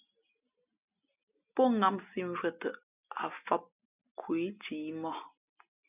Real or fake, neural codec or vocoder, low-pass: real; none; 3.6 kHz